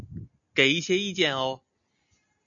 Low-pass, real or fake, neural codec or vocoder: 7.2 kHz; real; none